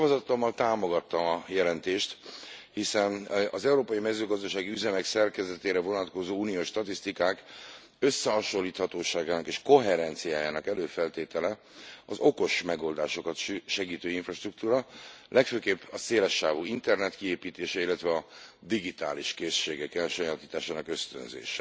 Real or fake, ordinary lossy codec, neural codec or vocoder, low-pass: real; none; none; none